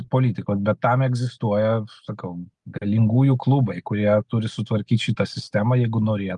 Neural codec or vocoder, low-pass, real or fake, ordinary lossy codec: none; 10.8 kHz; real; Opus, 32 kbps